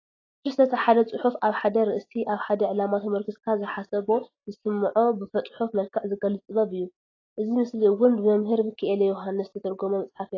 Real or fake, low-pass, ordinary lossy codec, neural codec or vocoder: real; 7.2 kHz; AAC, 32 kbps; none